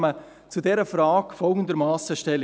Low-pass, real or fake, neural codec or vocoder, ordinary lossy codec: none; real; none; none